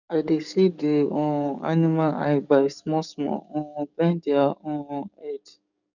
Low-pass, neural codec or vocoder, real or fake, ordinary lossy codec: 7.2 kHz; codec, 44.1 kHz, 7.8 kbps, DAC; fake; none